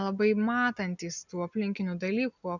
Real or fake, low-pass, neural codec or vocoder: real; 7.2 kHz; none